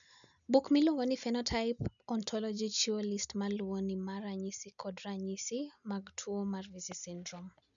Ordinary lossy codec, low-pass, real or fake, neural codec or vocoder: none; 7.2 kHz; real; none